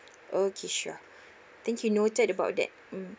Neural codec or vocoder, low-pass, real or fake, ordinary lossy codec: none; none; real; none